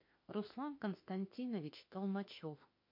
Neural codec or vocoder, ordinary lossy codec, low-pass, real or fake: autoencoder, 48 kHz, 32 numbers a frame, DAC-VAE, trained on Japanese speech; MP3, 32 kbps; 5.4 kHz; fake